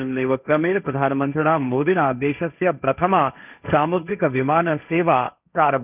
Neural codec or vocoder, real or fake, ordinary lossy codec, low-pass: codec, 16 kHz, 1.1 kbps, Voila-Tokenizer; fake; none; 3.6 kHz